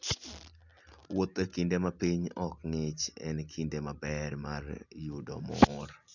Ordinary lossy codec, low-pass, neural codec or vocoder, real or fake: none; 7.2 kHz; none; real